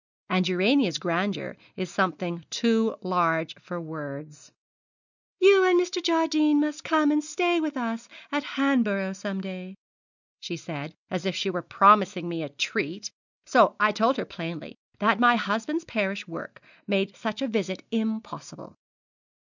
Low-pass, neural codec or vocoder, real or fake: 7.2 kHz; none; real